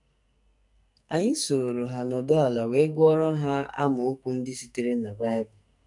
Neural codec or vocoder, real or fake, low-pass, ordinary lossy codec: codec, 44.1 kHz, 2.6 kbps, SNAC; fake; 10.8 kHz; none